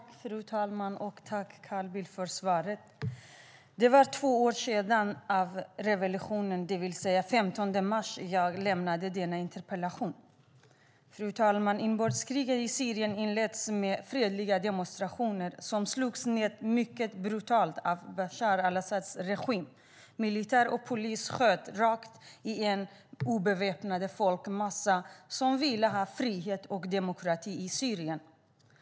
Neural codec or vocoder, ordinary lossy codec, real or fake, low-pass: none; none; real; none